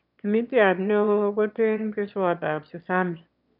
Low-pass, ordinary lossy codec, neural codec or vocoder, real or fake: 5.4 kHz; none; autoencoder, 22.05 kHz, a latent of 192 numbers a frame, VITS, trained on one speaker; fake